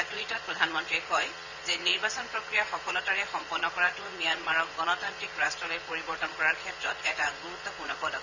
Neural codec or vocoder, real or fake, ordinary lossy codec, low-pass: vocoder, 22.05 kHz, 80 mel bands, Vocos; fake; none; 7.2 kHz